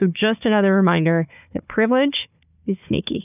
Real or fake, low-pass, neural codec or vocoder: fake; 3.6 kHz; codec, 16 kHz, 4 kbps, FunCodec, trained on Chinese and English, 50 frames a second